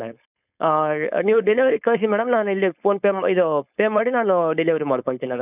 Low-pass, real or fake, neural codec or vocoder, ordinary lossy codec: 3.6 kHz; fake; codec, 16 kHz, 4.8 kbps, FACodec; none